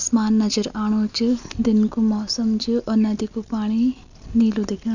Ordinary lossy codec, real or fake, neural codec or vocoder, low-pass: none; real; none; 7.2 kHz